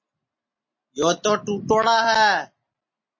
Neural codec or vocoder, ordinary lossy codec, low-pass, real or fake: none; MP3, 32 kbps; 7.2 kHz; real